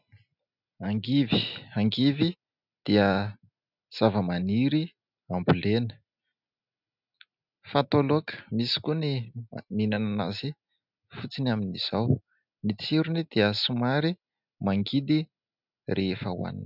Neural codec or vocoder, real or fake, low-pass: none; real; 5.4 kHz